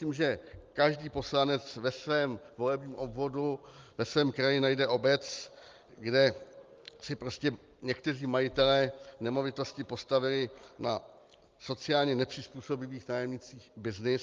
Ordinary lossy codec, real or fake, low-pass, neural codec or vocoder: Opus, 32 kbps; real; 7.2 kHz; none